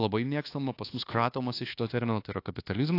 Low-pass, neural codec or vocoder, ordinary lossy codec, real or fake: 5.4 kHz; codec, 24 kHz, 1.2 kbps, DualCodec; AAC, 32 kbps; fake